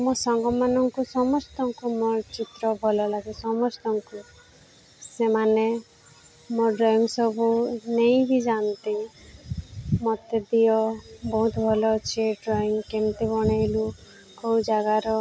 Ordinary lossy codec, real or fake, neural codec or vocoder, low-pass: none; real; none; none